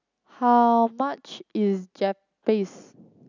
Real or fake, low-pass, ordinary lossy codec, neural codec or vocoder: real; 7.2 kHz; none; none